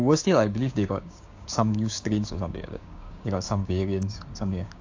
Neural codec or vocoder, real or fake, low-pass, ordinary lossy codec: codec, 16 kHz, 6 kbps, DAC; fake; 7.2 kHz; AAC, 48 kbps